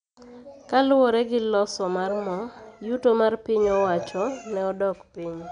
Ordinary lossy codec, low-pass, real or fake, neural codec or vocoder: none; 9.9 kHz; real; none